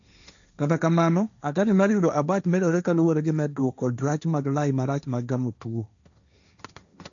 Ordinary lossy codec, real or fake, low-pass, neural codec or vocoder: none; fake; 7.2 kHz; codec, 16 kHz, 1.1 kbps, Voila-Tokenizer